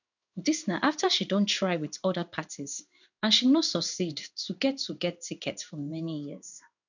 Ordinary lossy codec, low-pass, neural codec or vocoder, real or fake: none; 7.2 kHz; codec, 16 kHz in and 24 kHz out, 1 kbps, XY-Tokenizer; fake